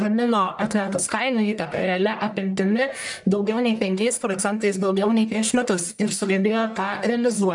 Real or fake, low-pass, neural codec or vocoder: fake; 10.8 kHz; codec, 44.1 kHz, 1.7 kbps, Pupu-Codec